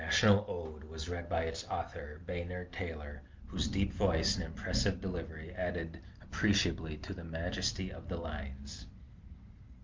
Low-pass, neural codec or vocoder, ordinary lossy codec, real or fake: 7.2 kHz; none; Opus, 24 kbps; real